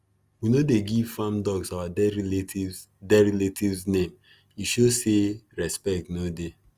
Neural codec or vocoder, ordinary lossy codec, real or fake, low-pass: none; Opus, 32 kbps; real; 14.4 kHz